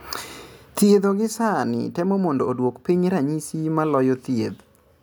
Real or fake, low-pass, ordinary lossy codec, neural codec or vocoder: real; none; none; none